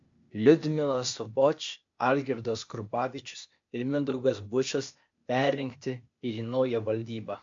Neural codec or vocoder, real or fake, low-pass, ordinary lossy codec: codec, 16 kHz, 0.8 kbps, ZipCodec; fake; 7.2 kHz; MP3, 48 kbps